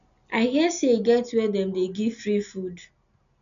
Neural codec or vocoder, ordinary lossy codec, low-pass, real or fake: none; none; 7.2 kHz; real